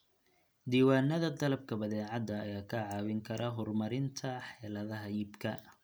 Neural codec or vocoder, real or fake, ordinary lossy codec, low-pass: none; real; none; none